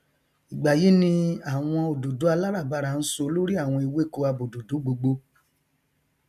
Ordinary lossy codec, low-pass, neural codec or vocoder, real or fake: none; 14.4 kHz; none; real